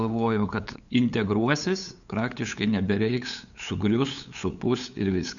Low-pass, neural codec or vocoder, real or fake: 7.2 kHz; codec, 16 kHz, 8 kbps, FunCodec, trained on LibriTTS, 25 frames a second; fake